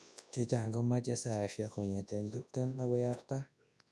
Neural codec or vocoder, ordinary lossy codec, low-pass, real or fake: codec, 24 kHz, 0.9 kbps, WavTokenizer, large speech release; none; none; fake